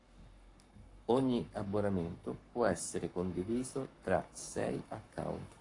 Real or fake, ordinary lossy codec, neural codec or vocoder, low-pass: fake; AAC, 48 kbps; codec, 44.1 kHz, 7.8 kbps, Pupu-Codec; 10.8 kHz